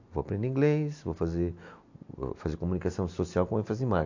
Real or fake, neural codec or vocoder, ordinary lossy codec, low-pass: real; none; AAC, 48 kbps; 7.2 kHz